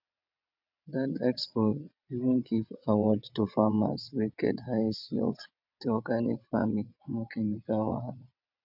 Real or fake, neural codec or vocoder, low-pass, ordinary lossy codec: fake; vocoder, 22.05 kHz, 80 mel bands, WaveNeXt; 5.4 kHz; none